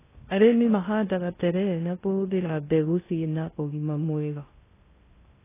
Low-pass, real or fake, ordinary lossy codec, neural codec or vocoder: 3.6 kHz; fake; AAC, 16 kbps; codec, 16 kHz in and 24 kHz out, 0.6 kbps, FocalCodec, streaming, 2048 codes